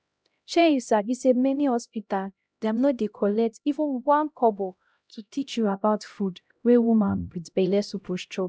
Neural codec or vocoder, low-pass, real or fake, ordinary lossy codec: codec, 16 kHz, 0.5 kbps, X-Codec, HuBERT features, trained on LibriSpeech; none; fake; none